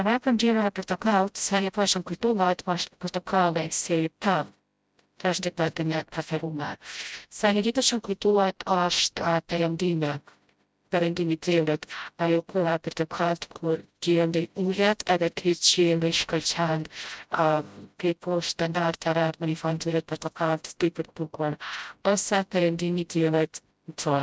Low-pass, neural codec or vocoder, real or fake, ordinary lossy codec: none; codec, 16 kHz, 0.5 kbps, FreqCodec, smaller model; fake; none